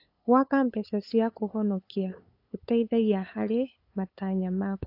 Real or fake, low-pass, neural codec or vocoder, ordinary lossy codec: fake; 5.4 kHz; codec, 16 kHz, 8 kbps, FunCodec, trained on LibriTTS, 25 frames a second; AAC, 32 kbps